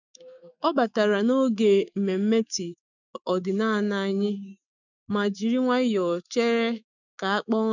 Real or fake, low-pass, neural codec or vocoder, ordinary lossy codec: fake; 7.2 kHz; autoencoder, 48 kHz, 128 numbers a frame, DAC-VAE, trained on Japanese speech; none